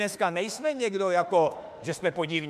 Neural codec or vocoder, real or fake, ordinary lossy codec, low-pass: autoencoder, 48 kHz, 32 numbers a frame, DAC-VAE, trained on Japanese speech; fake; MP3, 96 kbps; 14.4 kHz